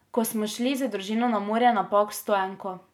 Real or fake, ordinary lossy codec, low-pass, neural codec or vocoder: real; none; 19.8 kHz; none